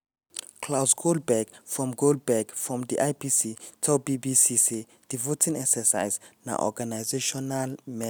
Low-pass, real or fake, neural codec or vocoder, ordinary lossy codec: none; real; none; none